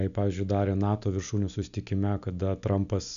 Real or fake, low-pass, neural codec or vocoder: real; 7.2 kHz; none